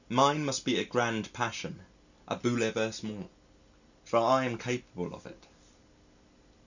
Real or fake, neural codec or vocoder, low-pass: real; none; 7.2 kHz